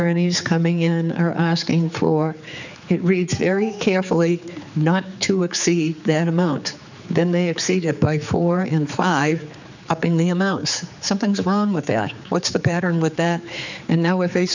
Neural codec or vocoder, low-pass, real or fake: codec, 16 kHz, 4 kbps, X-Codec, HuBERT features, trained on general audio; 7.2 kHz; fake